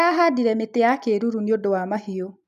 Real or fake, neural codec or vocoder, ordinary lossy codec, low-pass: real; none; none; 14.4 kHz